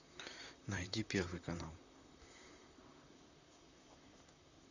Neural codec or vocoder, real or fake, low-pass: vocoder, 44.1 kHz, 128 mel bands, Pupu-Vocoder; fake; 7.2 kHz